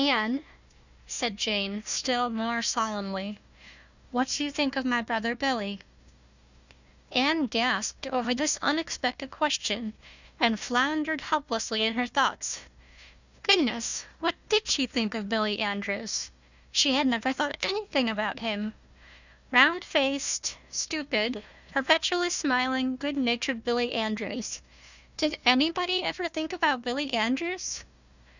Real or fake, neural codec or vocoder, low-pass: fake; codec, 16 kHz, 1 kbps, FunCodec, trained on Chinese and English, 50 frames a second; 7.2 kHz